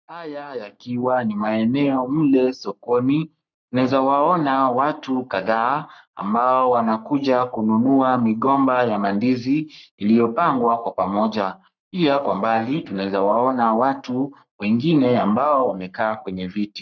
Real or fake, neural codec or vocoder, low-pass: fake; codec, 44.1 kHz, 3.4 kbps, Pupu-Codec; 7.2 kHz